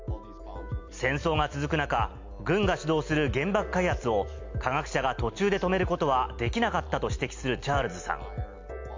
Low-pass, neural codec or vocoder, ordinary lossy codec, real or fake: 7.2 kHz; none; MP3, 64 kbps; real